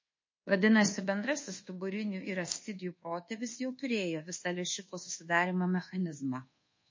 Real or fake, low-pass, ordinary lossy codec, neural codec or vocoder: fake; 7.2 kHz; MP3, 32 kbps; codec, 24 kHz, 1.2 kbps, DualCodec